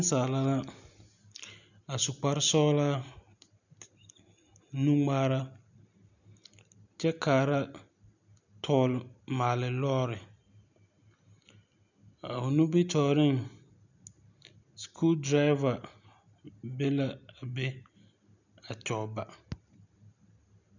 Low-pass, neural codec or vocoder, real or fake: 7.2 kHz; none; real